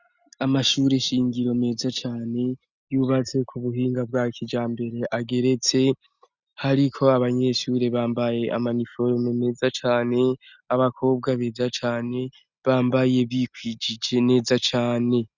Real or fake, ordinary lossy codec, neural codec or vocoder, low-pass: real; Opus, 64 kbps; none; 7.2 kHz